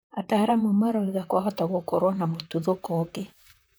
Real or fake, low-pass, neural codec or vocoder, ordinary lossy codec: fake; none; vocoder, 44.1 kHz, 128 mel bands, Pupu-Vocoder; none